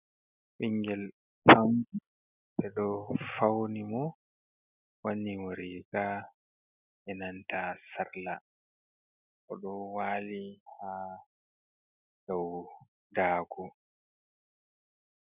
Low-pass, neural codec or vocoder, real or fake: 3.6 kHz; none; real